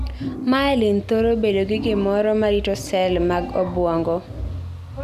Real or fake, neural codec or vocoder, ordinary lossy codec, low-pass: real; none; none; 14.4 kHz